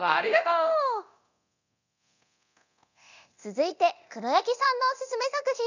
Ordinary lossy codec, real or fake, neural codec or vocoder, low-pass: none; fake; codec, 24 kHz, 0.9 kbps, DualCodec; 7.2 kHz